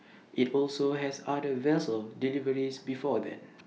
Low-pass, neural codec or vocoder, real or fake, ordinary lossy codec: none; none; real; none